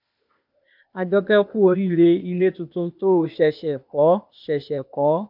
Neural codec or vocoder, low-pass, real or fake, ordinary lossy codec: codec, 16 kHz, 0.8 kbps, ZipCodec; 5.4 kHz; fake; none